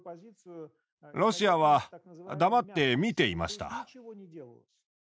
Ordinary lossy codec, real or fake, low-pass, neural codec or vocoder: none; real; none; none